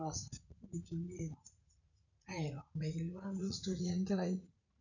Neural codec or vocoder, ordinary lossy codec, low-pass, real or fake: vocoder, 44.1 kHz, 80 mel bands, Vocos; none; 7.2 kHz; fake